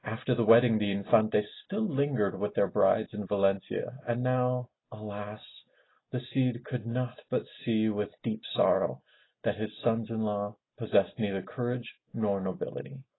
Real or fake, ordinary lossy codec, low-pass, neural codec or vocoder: real; AAC, 16 kbps; 7.2 kHz; none